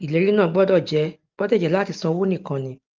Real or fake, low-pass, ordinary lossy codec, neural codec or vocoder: real; 7.2 kHz; Opus, 24 kbps; none